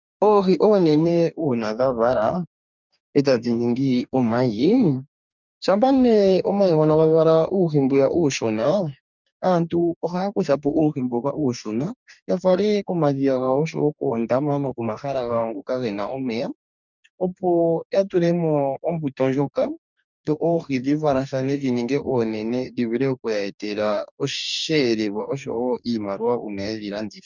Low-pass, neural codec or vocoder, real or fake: 7.2 kHz; codec, 44.1 kHz, 2.6 kbps, DAC; fake